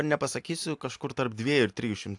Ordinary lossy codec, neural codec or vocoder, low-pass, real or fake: AAC, 64 kbps; none; 10.8 kHz; real